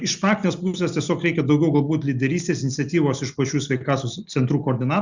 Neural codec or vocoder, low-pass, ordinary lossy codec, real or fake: none; 7.2 kHz; Opus, 64 kbps; real